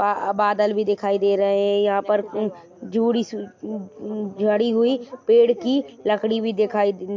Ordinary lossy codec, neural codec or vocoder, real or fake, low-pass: MP3, 48 kbps; none; real; 7.2 kHz